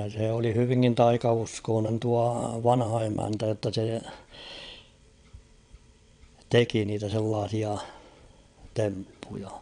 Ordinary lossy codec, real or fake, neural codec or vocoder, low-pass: none; fake; vocoder, 22.05 kHz, 80 mel bands, WaveNeXt; 9.9 kHz